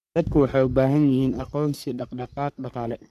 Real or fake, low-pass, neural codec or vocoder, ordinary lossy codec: fake; 14.4 kHz; codec, 44.1 kHz, 3.4 kbps, Pupu-Codec; none